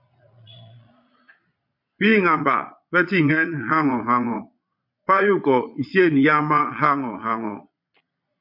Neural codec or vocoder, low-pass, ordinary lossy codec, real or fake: vocoder, 22.05 kHz, 80 mel bands, Vocos; 5.4 kHz; MP3, 48 kbps; fake